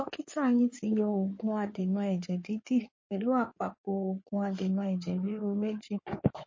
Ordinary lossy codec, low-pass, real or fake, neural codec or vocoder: MP3, 32 kbps; 7.2 kHz; fake; codec, 16 kHz in and 24 kHz out, 2.2 kbps, FireRedTTS-2 codec